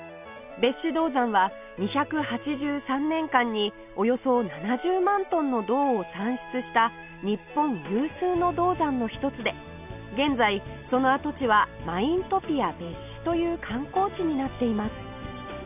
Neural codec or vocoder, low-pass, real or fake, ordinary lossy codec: none; 3.6 kHz; real; none